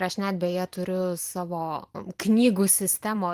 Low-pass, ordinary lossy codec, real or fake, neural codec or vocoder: 14.4 kHz; Opus, 24 kbps; fake; vocoder, 44.1 kHz, 128 mel bands every 512 samples, BigVGAN v2